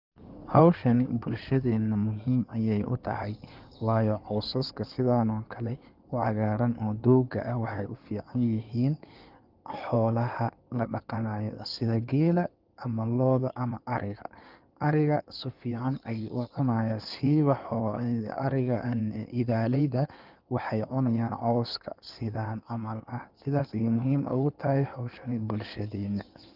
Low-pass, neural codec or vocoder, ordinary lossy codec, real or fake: 5.4 kHz; codec, 16 kHz in and 24 kHz out, 2.2 kbps, FireRedTTS-2 codec; Opus, 24 kbps; fake